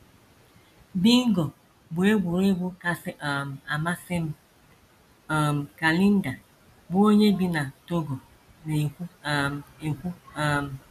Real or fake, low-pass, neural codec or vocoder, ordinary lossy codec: real; 14.4 kHz; none; none